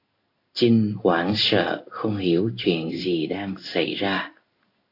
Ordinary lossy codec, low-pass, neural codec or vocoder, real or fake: AAC, 32 kbps; 5.4 kHz; codec, 16 kHz in and 24 kHz out, 1 kbps, XY-Tokenizer; fake